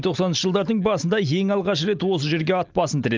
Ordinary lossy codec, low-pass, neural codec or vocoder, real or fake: Opus, 32 kbps; 7.2 kHz; none; real